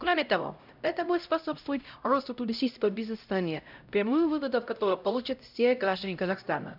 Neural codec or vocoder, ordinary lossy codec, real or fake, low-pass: codec, 16 kHz, 0.5 kbps, X-Codec, HuBERT features, trained on LibriSpeech; none; fake; 5.4 kHz